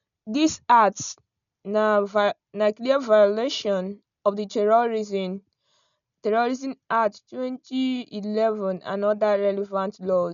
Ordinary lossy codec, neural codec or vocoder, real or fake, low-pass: none; none; real; 7.2 kHz